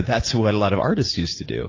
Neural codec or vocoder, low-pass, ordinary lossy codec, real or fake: none; 7.2 kHz; AAC, 32 kbps; real